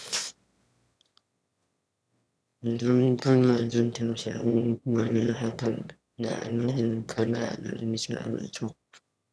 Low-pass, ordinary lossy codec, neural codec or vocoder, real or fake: none; none; autoencoder, 22.05 kHz, a latent of 192 numbers a frame, VITS, trained on one speaker; fake